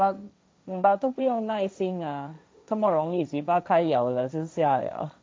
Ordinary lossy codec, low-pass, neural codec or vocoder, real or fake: none; none; codec, 16 kHz, 1.1 kbps, Voila-Tokenizer; fake